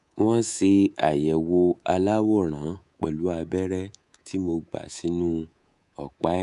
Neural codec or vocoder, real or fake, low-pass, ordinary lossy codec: codec, 24 kHz, 3.1 kbps, DualCodec; fake; 10.8 kHz; none